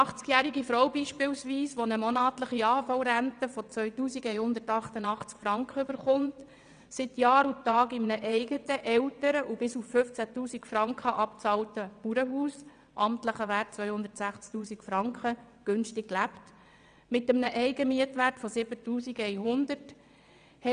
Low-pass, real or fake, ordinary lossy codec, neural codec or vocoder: 9.9 kHz; fake; none; vocoder, 22.05 kHz, 80 mel bands, WaveNeXt